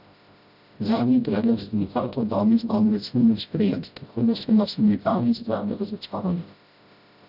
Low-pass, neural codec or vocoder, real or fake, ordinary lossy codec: 5.4 kHz; codec, 16 kHz, 0.5 kbps, FreqCodec, smaller model; fake; none